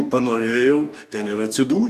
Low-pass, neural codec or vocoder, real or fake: 14.4 kHz; codec, 44.1 kHz, 2.6 kbps, DAC; fake